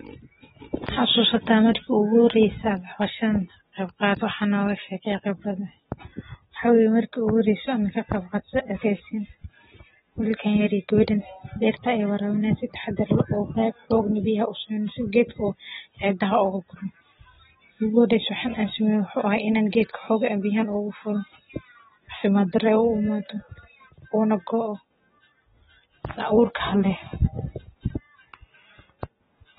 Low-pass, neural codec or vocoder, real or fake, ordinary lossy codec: 19.8 kHz; none; real; AAC, 16 kbps